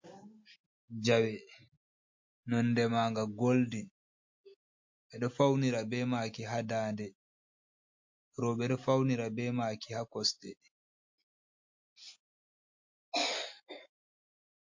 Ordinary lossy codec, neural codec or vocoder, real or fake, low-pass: MP3, 48 kbps; none; real; 7.2 kHz